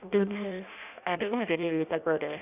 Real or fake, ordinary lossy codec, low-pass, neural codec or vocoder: fake; none; 3.6 kHz; codec, 16 kHz in and 24 kHz out, 0.6 kbps, FireRedTTS-2 codec